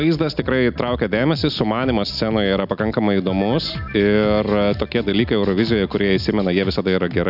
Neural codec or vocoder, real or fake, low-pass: none; real; 5.4 kHz